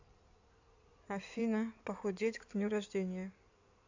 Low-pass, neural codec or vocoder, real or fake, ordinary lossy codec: 7.2 kHz; codec, 16 kHz in and 24 kHz out, 2.2 kbps, FireRedTTS-2 codec; fake; AAC, 48 kbps